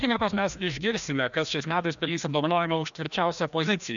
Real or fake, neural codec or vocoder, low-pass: fake; codec, 16 kHz, 1 kbps, FreqCodec, larger model; 7.2 kHz